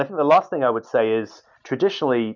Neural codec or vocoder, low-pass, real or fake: none; 7.2 kHz; real